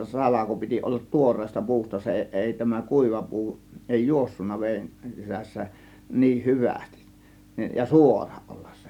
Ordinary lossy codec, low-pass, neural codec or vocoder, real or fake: none; 19.8 kHz; none; real